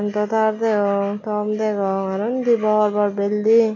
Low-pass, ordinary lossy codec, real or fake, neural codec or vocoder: 7.2 kHz; none; real; none